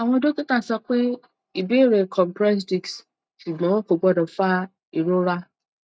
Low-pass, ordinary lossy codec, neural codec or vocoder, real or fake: none; none; none; real